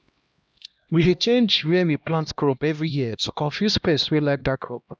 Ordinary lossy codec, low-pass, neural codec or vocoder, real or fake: none; none; codec, 16 kHz, 1 kbps, X-Codec, HuBERT features, trained on LibriSpeech; fake